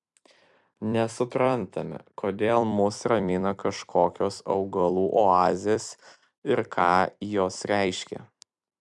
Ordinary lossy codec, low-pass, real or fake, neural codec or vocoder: MP3, 96 kbps; 10.8 kHz; fake; vocoder, 44.1 kHz, 128 mel bands every 256 samples, BigVGAN v2